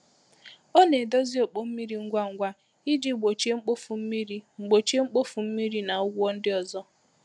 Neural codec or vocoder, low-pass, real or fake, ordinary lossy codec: none; 10.8 kHz; real; none